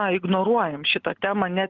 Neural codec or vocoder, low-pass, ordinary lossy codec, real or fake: none; 7.2 kHz; Opus, 32 kbps; real